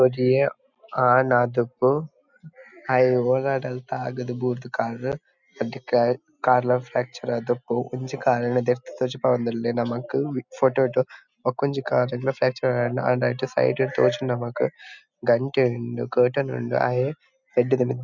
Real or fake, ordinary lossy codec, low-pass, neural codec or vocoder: real; none; 7.2 kHz; none